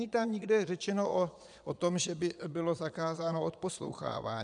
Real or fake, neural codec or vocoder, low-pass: fake; vocoder, 22.05 kHz, 80 mel bands, Vocos; 9.9 kHz